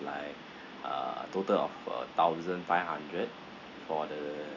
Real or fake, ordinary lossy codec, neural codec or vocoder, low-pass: real; none; none; 7.2 kHz